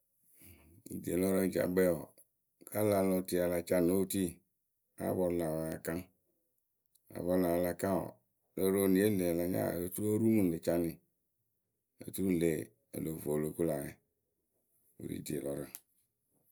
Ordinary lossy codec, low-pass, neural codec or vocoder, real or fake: none; none; none; real